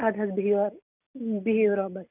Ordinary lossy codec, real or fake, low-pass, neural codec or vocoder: none; real; 3.6 kHz; none